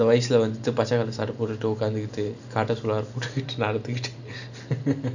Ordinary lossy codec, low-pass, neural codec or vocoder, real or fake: none; 7.2 kHz; none; real